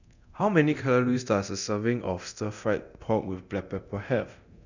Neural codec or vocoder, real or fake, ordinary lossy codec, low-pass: codec, 24 kHz, 0.9 kbps, DualCodec; fake; none; 7.2 kHz